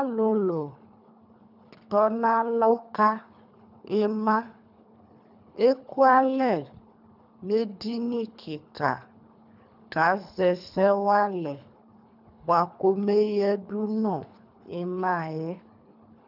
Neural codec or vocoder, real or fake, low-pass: codec, 24 kHz, 3 kbps, HILCodec; fake; 5.4 kHz